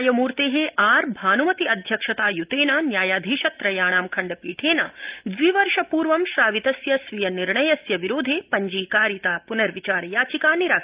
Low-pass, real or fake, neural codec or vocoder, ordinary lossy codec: 3.6 kHz; real; none; Opus, 24 kbps